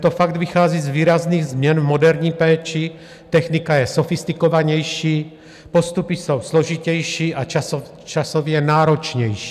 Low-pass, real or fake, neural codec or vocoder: 14.4 kHz; real; none